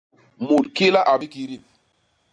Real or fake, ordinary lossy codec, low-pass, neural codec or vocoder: real; AAC, 64 kbps; 9.9 kHz; none